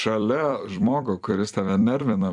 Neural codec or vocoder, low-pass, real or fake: vocoder, 44.1 kHz, 128 mel bands every 256 samples, BigVGAN v2; 10.8 kHz; fake